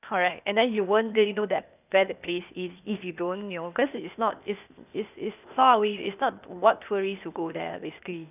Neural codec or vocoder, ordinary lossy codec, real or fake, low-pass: codec, 16 kHz, 0.8 kbps, ZipCodec; none; fake; 3.6 kHz